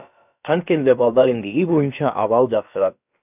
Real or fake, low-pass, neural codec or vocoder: fake; 3.6 kHz; codec, 16 kHz, about 1 kbps, DyCAST, with the encoder's durations